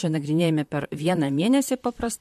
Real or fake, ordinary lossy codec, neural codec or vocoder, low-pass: fake; MP3, 64 kbps; vocoder, 44.1 kHz, 128 mel bands, Pupu-Vocoder; 14.4 kHz